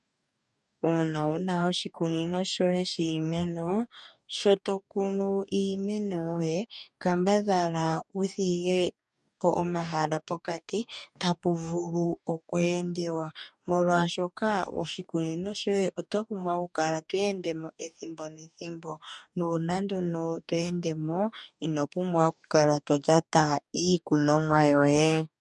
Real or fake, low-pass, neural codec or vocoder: fake; 10.8 kHz; codec, 44.1 kHz, 2.6 kbps, DAC